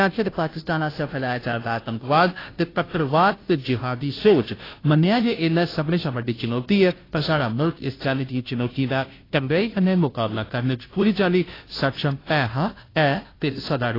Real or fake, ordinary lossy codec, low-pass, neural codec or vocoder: fake; AAC, 24 kbps; 5.4 kHz; codec, 16 kHz, 0.5 kbps, FunCodec, trained on Chinese and English, 25 frames a second